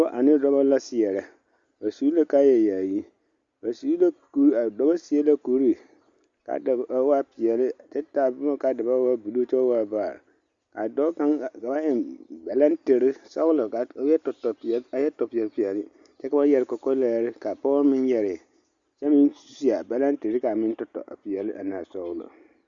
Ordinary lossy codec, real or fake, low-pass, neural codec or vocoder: Opus, 64 kbps; real; 7.2 kHz; none